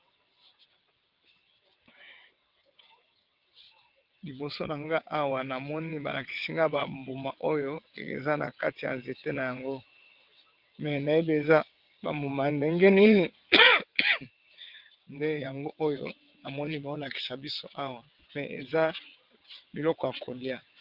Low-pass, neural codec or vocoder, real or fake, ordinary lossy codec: 5.4 kHz; vocoder, 22.05 kHz, 80 mel bands, WaveNeXt; fake; Opus, 32 kbps